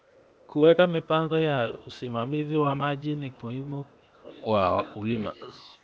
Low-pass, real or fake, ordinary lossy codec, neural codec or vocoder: none; fake; none; codec, 16 kHz, 0.8 kbps, ZipCodec